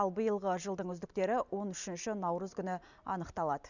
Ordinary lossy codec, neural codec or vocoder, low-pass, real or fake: none; none; 7.2 kHz; real